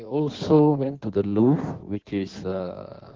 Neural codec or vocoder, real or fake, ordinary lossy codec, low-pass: codec, 16 kHz in and 24 kHz out, 1.1 kbps, FireRedTTS-2 codec; fake; Opus, 16 kbps; 7.2 kHz